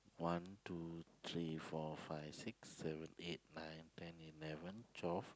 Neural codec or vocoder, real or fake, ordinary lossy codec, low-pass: none; real; none; none